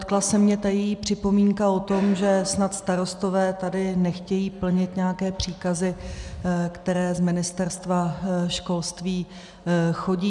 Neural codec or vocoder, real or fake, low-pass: none; real; 10.8 kHz